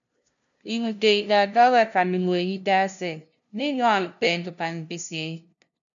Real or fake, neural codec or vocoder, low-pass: fake; codec, 16 kHz, 0.5 kbps, FunCodec, trained on LibriTTS, 25 frames a second; 7.2 kHz